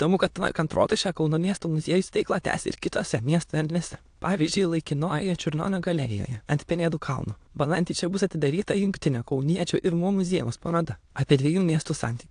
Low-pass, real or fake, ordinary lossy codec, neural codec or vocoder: 9.9 kHz; fake; AAC, 64 kbps; autoencoder, 22.05 kHz, a latent of 192 numbers a frame, VITS, trained on many speakers